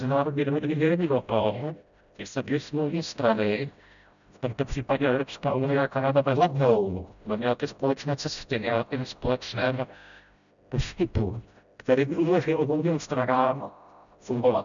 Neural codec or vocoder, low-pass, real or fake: codec, 16 kHz, 0.5 kbps, FreqCodec, smaller model; 7.2 kHz; fake